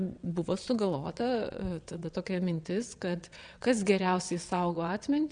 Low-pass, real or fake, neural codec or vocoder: 9.9 kHz; fake; vocoder, 22.05 kHz, 80 mel bands, WaveNeXt